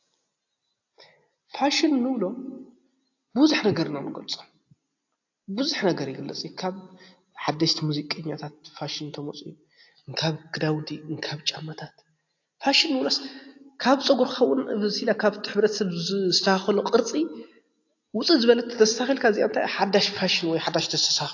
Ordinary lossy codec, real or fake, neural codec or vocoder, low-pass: AAC, 48 kbps; real; none; 7.2 kHz